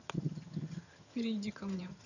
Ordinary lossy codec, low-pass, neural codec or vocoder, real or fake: none; 7.2 kHz; vocoder, 22.05 kHz, 80 mel bands, HiFi-GAN; fake